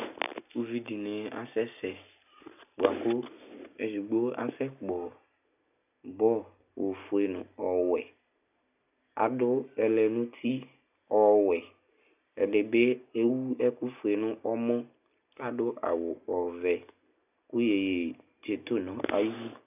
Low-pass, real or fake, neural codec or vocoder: 3.6 kHz; real; none